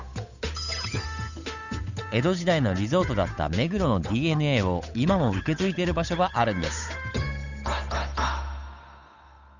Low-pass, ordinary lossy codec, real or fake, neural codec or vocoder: 7.2 kHz; none; fake; codec, 16 kHz, 8 kbps, FunCodec, trained on Chinese and English, 25 frames a second